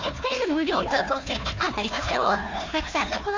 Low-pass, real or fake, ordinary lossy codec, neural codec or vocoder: 7.2 kHz; fake; AAC, 48 kbps; codec, 16 kHz, 1 kbps, FunCodec, trained on Chinese and English, 50 frames a second